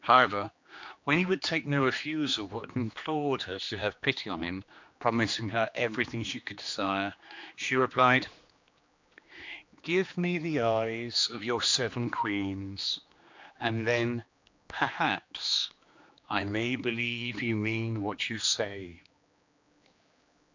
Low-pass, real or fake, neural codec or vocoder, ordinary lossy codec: 7.2 kHz; fake; codec, 16 kHz, 2 kbps, X-Codec, HuBERT features, trained on general audio; MP3, 64 kbps